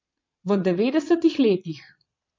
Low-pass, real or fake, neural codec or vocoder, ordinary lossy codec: 7.2 kHz; fake; vocoder, 22.05 kHz, 80 mel bands, Vocos; MP3, 64 kbps